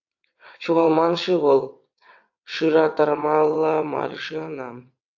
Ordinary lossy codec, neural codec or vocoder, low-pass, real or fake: AAC, 48 kbps; vocoder, 22.05 kHz, 80 mel bands, WaveNeXt; 7.2 kHz; fake